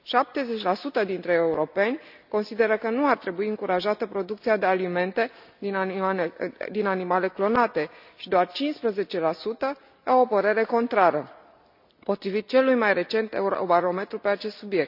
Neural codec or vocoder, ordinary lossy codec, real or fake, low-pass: none; none; real; 5.4 kHz